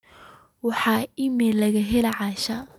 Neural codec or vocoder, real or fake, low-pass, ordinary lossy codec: none; real; 19.8 kHz; none